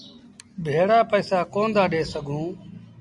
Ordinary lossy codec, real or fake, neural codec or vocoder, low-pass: MP3, 96 kbps; real; none; 10.8 kHz